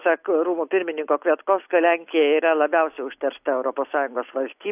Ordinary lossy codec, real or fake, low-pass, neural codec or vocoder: AAC, 32 kbps; real; 3.6 kHz; none